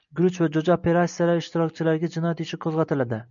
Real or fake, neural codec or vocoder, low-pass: real; none; 7.2 kHz